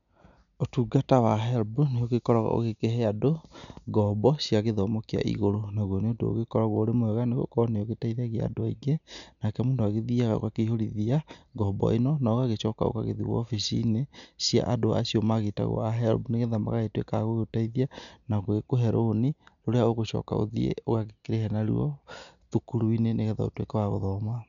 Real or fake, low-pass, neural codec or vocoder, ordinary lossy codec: real; 7.2 kHz; none; none